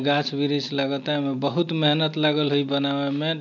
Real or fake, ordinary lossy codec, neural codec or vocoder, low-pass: real; none; none; 7.2 kHz